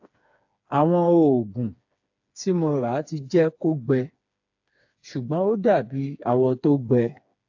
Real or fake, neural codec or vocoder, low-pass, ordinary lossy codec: fake; codec, 16 kHz, 4 kbps, FreqCodec, smaller model; 7.2 kHz; AAC, 48 kbps